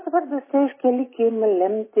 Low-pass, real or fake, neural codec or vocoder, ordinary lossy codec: 3.6 kHz; real; none; MP3, 16 kbps